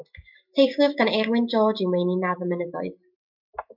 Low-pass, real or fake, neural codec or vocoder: 5.4 kHz; real; none